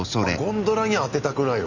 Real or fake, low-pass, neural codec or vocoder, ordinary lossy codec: real; 7.2 kHz; none; none